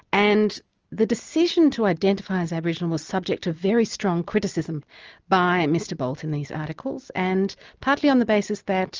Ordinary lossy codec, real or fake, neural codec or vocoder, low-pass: Opus, 24 kbps; real; none; 7.2 kHz